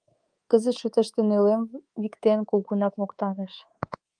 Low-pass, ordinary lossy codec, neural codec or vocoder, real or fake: 9.9 kHz; Opus, 32 kbps; codec, 24 kHz, 3.1 kbps, DualCodec; fake